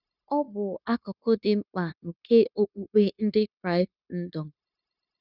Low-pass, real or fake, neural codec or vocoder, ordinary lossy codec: 5.4 kHz; fake; codec, 16 kHz, 0.9 kbps, LongCat-Audio-Codec; none